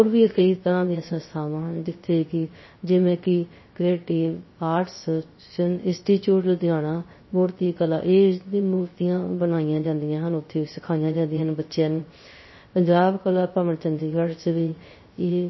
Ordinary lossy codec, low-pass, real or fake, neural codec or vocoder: MP3, 24 kbps; 7.2 kHz; fake; codec, 16 kHz, 0.3 kbps, FocalCodec